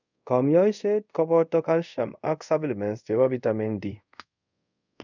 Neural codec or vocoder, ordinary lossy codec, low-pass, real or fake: codec, 24 kHz, 0.5 kbps, DualCodec; none; 7.2 kHz; fake